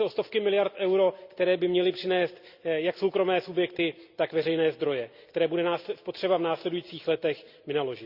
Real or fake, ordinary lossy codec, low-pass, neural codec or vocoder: real; Opus, 64 kbps; 5.4 kHz; none